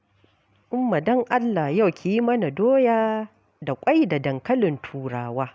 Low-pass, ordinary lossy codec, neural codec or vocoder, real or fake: none; none; none; real